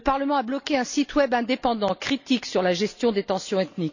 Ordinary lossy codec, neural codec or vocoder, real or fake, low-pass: none; none; real; 7.2 kHz